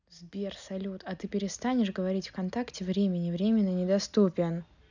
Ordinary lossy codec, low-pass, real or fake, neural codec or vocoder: none; 7.2 kHz; real; none